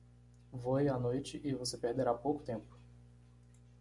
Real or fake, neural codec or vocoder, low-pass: real; none; 10.8 kHz